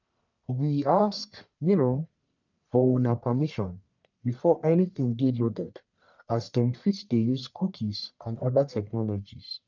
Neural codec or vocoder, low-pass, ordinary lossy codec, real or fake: codec, 44.1 kHz, 1.7 kbps, Pupu-Codec; 7.2 kHz; none; fake